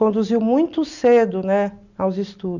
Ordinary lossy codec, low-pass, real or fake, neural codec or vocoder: none; 7.2 kHz; real; none